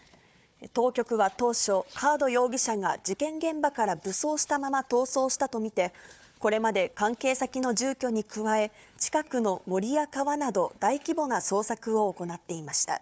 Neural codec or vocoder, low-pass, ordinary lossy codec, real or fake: codec, 16 kHz, 4 kbps, FunCodec, trained on Chinese and English, 50 frames a second; none; none; fake